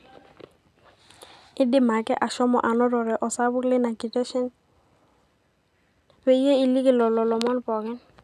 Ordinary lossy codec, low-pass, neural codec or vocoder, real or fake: none; 14.4 kHz; none; real